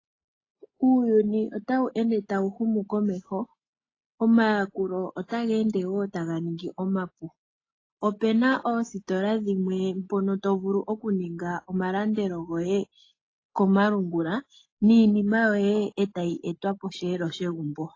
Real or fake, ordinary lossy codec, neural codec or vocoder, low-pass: real; AAC, 32 kbps; none; 7.2 kHz